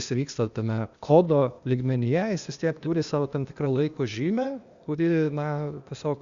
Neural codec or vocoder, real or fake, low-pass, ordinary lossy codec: codec, 16 kHz, 0.8 kbps, ZipCodec; fake; 7.2 kHz; Opus, 64 kbps